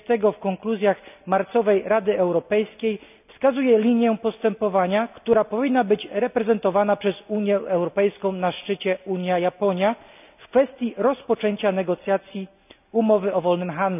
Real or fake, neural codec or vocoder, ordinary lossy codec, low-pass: real; none; none; 3.6 kHz